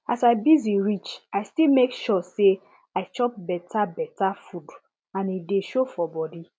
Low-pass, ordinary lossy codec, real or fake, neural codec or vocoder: none; none; real; none